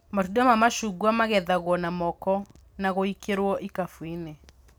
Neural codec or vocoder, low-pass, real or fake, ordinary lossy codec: none; none; real; none